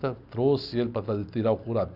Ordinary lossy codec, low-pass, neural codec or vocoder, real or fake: none; 5.4 kHz; none; real